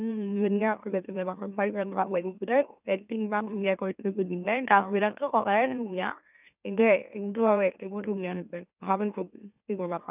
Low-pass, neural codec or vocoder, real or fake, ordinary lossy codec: 3.6 kHz; autoencoder, 44.1 kHz, a latent of 192 numbers a frame, MeloTTS; fake; none